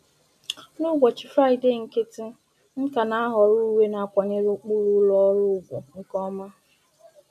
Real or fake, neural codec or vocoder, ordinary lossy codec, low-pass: real; none; none; 14.4 kHz